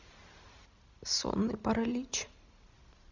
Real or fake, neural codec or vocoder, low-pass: real; none; 7.2 kHz